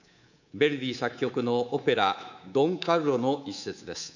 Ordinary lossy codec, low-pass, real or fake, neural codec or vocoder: none; 7.2 kHz; fake; codec, 24 kHz, 3.1 kbps, DualCodec